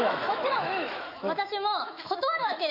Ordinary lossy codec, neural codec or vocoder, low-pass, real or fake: none; autoencoder, 48 kHz, 128 numbers a frame, DAC-VAE, trained on Japanese speech; 5.4 kHz; fake